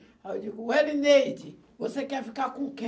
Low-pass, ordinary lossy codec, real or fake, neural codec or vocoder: none; none; real; none